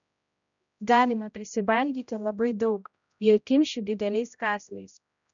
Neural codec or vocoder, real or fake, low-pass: codec, 16 kHz, 0.5 kbps, X-Codec, HuBERT features, trained on general audio; fake; 7.2 kHz